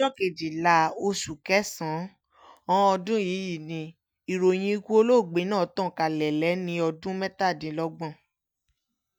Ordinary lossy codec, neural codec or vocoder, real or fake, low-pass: none; none; real; none